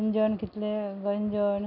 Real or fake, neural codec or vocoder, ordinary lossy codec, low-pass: real; none; none; 5.4 kHz